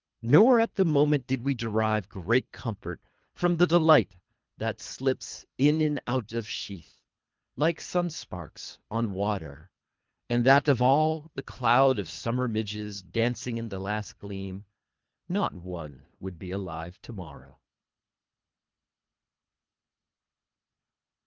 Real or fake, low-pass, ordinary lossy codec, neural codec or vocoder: fake; 7.2 kHz; Opus, 24 kbps; codec, 24 kHz, 3 kbps, HILCodec